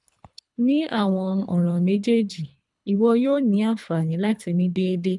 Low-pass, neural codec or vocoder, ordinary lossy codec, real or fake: 10.8 kHz; codec, 24 kHz, 3 kbps, HILCodec; none; fake